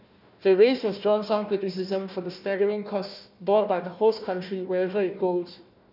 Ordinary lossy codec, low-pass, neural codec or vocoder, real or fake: none; 5.4 kHz; codec, 16 kHz, 1 kbps, FunCodec, trained on Chinese and English, 50 frames a second; fake